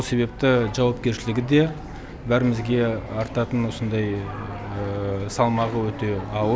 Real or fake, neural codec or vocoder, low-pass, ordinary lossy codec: real; none; none; none